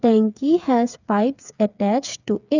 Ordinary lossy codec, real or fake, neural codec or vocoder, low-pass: none; fake; codec, 16 kHz, 8 kbps, FreqCodec, smaller model; 7.2 kHz